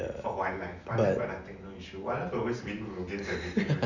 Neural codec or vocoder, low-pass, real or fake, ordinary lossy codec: none; 7.2 kHz; real; none